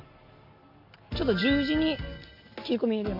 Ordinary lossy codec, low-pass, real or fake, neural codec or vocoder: MP3, 48 kbps; 5.4 kHz; real; none